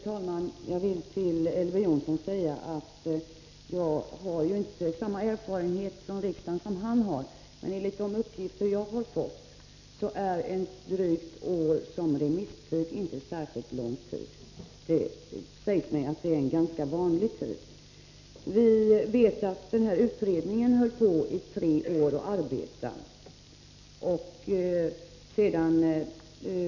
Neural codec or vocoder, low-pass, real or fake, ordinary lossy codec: none; 7.2 kHz; real; none